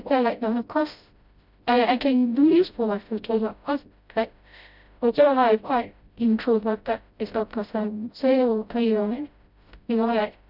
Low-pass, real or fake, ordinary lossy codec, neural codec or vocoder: 5.4 kHz; fake; none; codec, 16 kHz, 0.5 kbps, FreqCodec, smaller model